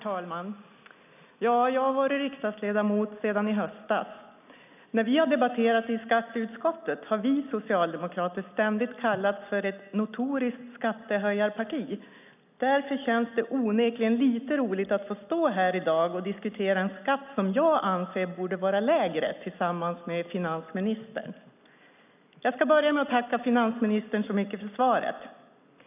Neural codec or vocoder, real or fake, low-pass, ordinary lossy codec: none; real; 3.6 kHz; none